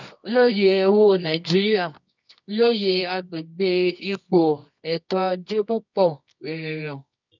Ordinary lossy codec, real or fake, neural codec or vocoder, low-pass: none; fake; codec, 24 kHz, 0.9 kbps, WavTokenizer, medium music audio release; 7.2 kHz